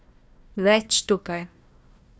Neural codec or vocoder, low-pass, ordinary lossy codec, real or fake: codec, 16 kHz, 1 kbps, FunCodec, trained on Chinese and English, 50 frames a second; none; none; fake